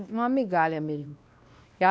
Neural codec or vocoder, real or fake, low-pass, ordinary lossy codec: codec, 16 kHz, 1 kbps, X-Codec, WavLM features, trained on Multilingual LibriSpeech; fake; none; none